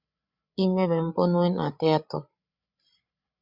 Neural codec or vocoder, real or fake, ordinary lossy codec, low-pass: codec, 16 kHz, 8 kbps, FreqCodec, larger model; fake; Opus, 64 kbps; 5.4 kHz